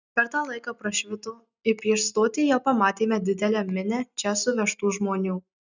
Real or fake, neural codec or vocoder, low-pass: real; none; 7.2 kHz